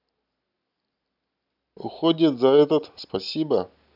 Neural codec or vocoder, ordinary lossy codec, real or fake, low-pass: none; none; real; 5.4 kHz